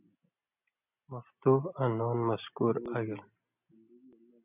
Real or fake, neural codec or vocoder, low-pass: real; none; 3.6 kHz